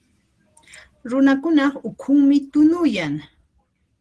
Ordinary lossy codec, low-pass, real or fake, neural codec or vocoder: Opus, 16 kbps; 10.8 kHz; real; none